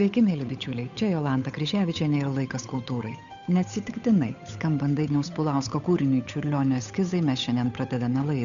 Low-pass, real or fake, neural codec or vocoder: 7.2 kHz; fake; codec, 16 kHz, 8 kbps, FunCodec, trained on Chinese and English, 25 frames a second